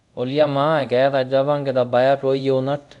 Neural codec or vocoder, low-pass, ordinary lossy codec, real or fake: codec, 24 kHz, 0.5 kbps, DualCodec; 10.8 kHz; none; fake